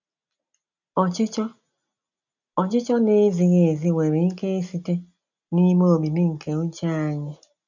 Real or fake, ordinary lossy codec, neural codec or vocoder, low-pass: real; none; none; 7.2 kHz